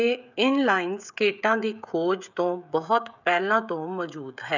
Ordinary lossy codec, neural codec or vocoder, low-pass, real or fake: none; codec, 16 kHz, 16 kbps, FreqCodec, smaller model; 7.2 kHz; fake